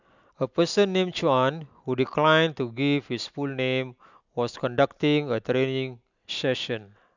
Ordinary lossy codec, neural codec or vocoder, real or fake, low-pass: none; none; real; 7.2 kHz